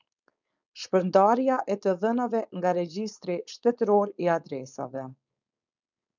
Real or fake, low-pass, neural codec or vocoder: fake; 7.2 kHz; codec, 16 kHz, 4.8 kbps, FACodec